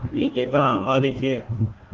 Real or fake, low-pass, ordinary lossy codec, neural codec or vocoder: fake; 7.2 kHz; Opus, 16 kbps; codec, 16 kHz, 1 kbps, FunCodec, trained on Chinese and English, 50 frames a second